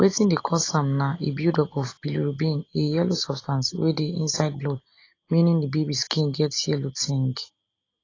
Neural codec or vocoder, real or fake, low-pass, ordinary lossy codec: none; real; 7.2 kHz; AAC, 32 kbps